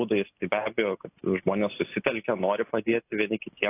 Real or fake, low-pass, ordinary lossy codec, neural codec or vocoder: real; 3.6 kHz; AAC, 24 kbps; none